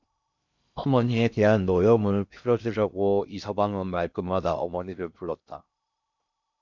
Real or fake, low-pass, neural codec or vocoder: fake; 7.2 kHz; codec, 16 kHz in and 24 kHz out, 0.6 kbps, FocalCodec, streaming, 2048 codes